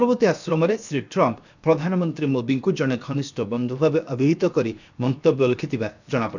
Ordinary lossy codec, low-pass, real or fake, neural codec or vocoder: none; 7.2 kHz; fake; codec, 16 kHz, 0.7 kbps, FocalCodec